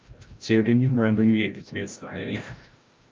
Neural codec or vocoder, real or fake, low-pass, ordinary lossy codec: codec, 16 kHz, 0.5 kbps, FreqCodec, larger model; fake; 7.2 kHz; Opus, 24 kbps